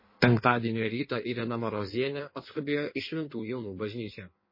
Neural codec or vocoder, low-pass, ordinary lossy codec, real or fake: codec, 16 kHz in and 24 kHz out, 1.1 kbps, FireRedTTS-2 codec; 5.4 kHz; MP3, 24 kbps; fake